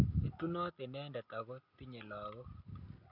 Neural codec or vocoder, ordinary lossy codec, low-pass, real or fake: none; none; 5.4 kHz; real